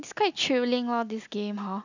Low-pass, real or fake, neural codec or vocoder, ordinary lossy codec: 7.2 kHz; real; none; none